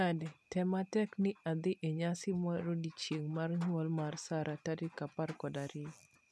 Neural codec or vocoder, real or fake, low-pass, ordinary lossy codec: none; real; none; none